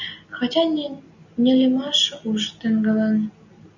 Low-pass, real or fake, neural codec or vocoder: 7.2 kHz; real; none